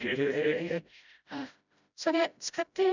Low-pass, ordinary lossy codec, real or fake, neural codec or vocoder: 7.2 kHz; none; fake; codec, 16 kHz, 0.5 kbps, FreqCodec, smaller model